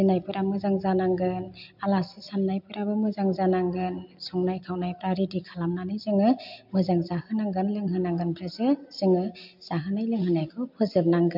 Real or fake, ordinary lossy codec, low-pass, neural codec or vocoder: real; MP3, 48 kbps; 5.4 kHz; none